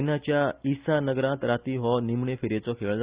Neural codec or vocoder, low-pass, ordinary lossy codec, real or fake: none; 3.6 kHz; Opus, 64 kbps; real